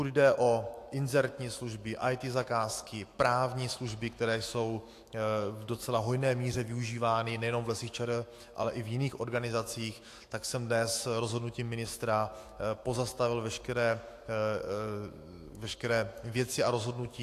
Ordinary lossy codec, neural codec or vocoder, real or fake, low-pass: AAC, 64 kbps; autoencoder, 48 kHz, 128 numbers a frame, DAC-VAE, trained on Japanese speech; fake; 14.4 kHz